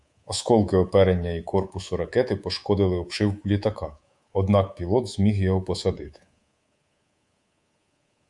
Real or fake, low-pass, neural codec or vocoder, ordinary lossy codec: fake; 10.8 kHz; codec, 24 kHz, 3.1 kbps, DualCodec; Opus, 64 kbps